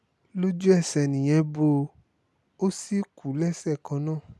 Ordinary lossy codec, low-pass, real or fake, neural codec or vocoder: none; none; real; none